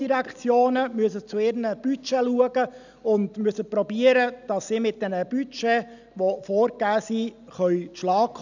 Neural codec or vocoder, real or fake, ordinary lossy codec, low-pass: none; real; none; 7.2 kHz